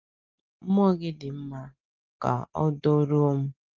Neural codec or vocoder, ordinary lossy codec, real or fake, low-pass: none; Opus, 32 kbps; real; 7.2 kHz